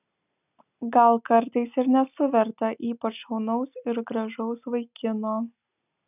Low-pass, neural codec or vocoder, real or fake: 3.6 kHz; none; real